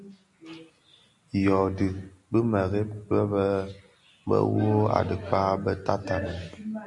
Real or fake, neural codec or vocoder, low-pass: real; none; 10.8 kHz